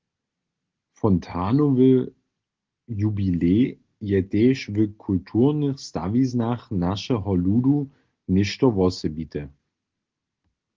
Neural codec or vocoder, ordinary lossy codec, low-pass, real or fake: none; Opus, 16 kbps; 7.2 kHz; real